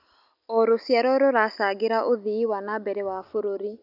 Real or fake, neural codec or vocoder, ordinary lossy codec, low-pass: real; none; none; 5.4 kHz